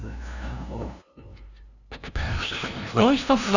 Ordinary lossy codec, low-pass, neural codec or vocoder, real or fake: none; 7.2 kHz; codec, 16 kHz, 0.5 kbps, FunCodec, trained on LibriTTS, 25 frames a second; fake